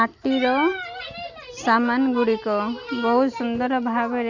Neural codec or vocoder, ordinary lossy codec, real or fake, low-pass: none; Opus, 64 kbps; real; 7.2 kHz